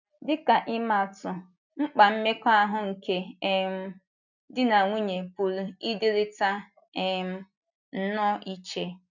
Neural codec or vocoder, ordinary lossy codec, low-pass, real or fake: none; none; 7.2 kHz; real